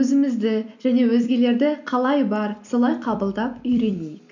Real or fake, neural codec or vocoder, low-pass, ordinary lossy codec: fake; vocoder, 44.1 kHz, 128 mel bands every 256 samples, BigVGAN v2; 7.2 kHz; none